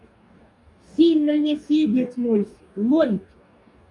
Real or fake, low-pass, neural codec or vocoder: fake; 10.8 kHz; codec, 44.1 kHz, 2.6 kbps, DAC